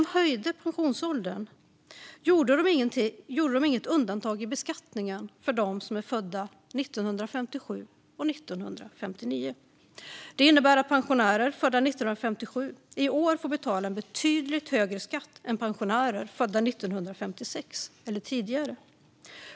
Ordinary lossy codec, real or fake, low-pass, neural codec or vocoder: none; real; none; none